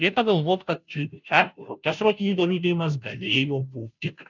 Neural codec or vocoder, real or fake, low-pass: codec, 16 kHz, 0.5 kbps, FunCodec, trained on Chinese and English, 25 frames a second; fake; 7.2 kHz